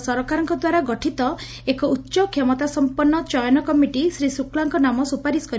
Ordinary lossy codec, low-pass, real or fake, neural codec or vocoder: none; none; real; none